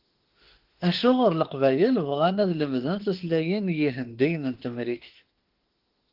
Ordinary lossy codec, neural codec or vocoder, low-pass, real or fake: Opus, 16 kbps; autoencoder, 48 kHz, 32 numbers a frame, DAC-VAE, trained on Japanese speech; 5.4 kHz; fake